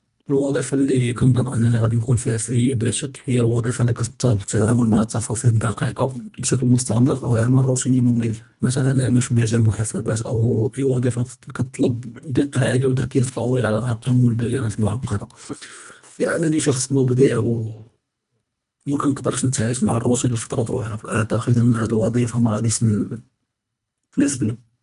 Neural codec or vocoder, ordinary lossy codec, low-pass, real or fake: codec, 24 kHz, 1.5 kbps, HILCodec; none; 10.8 kHz; fake